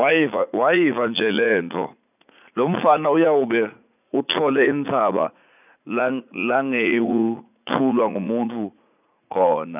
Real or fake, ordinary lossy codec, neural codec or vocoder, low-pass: fake; none; vocoder, 44.1 kHz, 80 mel bands, Vocos; 3.6 kHz